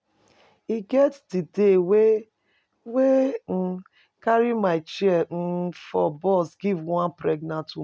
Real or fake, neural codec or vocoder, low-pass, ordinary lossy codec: real; none; none; none